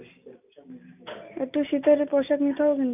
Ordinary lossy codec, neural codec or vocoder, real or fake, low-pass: none; none; real; 3.6 kHz